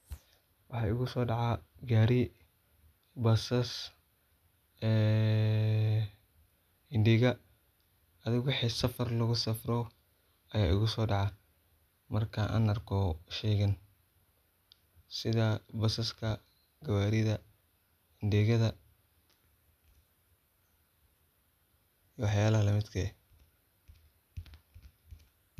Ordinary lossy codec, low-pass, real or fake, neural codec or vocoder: none; 14.4 kHz; real; none